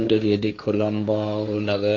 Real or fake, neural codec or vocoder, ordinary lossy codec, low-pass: fake; codec, 16 kHz, 1.1 kbps, Voila-Tokenizer; AAC, 48 kbps; 7.2 kHz